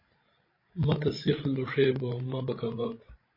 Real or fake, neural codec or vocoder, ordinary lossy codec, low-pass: fake; codec, 16 kHz, 16 kbps, FreqCodec, larger model; MP3, 24 kbps; 5.4 kHz